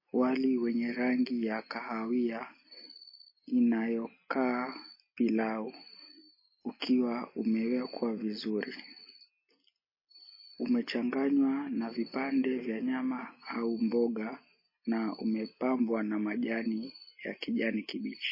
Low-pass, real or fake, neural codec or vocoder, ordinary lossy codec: 5.4 kHz; real; none; MP3, 24 kbps